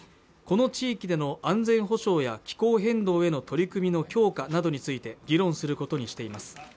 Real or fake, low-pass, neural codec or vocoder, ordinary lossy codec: real; none; none; none